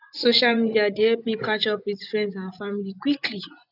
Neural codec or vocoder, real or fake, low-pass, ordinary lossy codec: vocoder, 24 kHz, 100 mel bands, Vocos; fake; 5.4 kHz; none